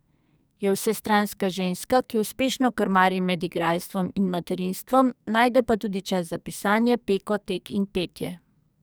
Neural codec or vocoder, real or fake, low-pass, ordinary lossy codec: codec, 44.1 kHz, 2.6 kbps, SNAC; fake; none; none